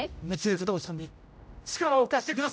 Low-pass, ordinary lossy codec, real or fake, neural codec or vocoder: none; none; fake; codec, 16 kHz, 0.5 kbps, X-Codec, HuBERT features, trained on general audio